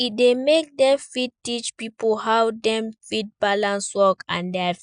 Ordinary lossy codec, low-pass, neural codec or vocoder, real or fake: none; 9.9 kHz; none; real